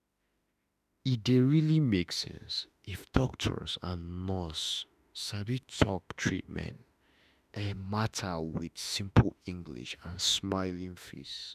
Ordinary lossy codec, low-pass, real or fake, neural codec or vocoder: none; 14.4 kHz; fake; autoencoder, 48 kHz, 32 numbers a frame, DAC-VAE, trained on Japanese speech